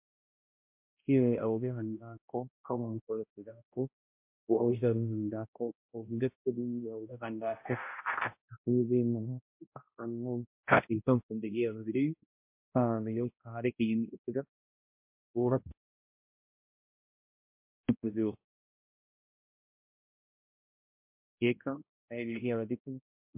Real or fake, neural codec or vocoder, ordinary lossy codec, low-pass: fake; codec, 16 kHz, 0.5 kbps, X-Codec, HuBERT features, trained on balanced general audio; MP3, 32 kbps; 3.6 kHz